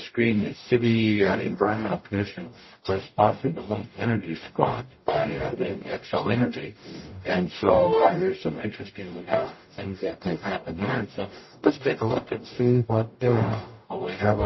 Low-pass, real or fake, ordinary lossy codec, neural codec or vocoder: 7.2 kHz; fake; MP3, 24 kbps; codec, 44.1 kHz, 0.9 kbps, DAC